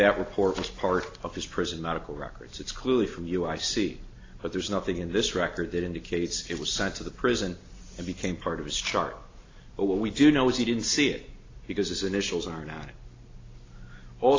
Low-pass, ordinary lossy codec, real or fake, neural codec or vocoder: 7.2 kHz; AAC, 32 kbps; real; none